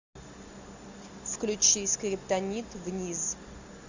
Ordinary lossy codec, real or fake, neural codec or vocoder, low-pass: Opus, 64 kbps; real; none; 7.2 kHz